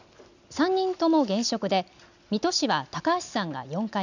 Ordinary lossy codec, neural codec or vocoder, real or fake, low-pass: none; none; real; 7.2 kHz